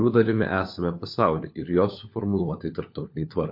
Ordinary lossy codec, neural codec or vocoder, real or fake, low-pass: MP3, 32 kbps; codec, 16 kHz, 4 kbps, FunCodec, trained on LibriTTS, 50 frames a second; fake; 5.4 kHz